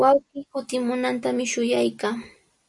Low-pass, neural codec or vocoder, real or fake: 10.8 kHz; none; real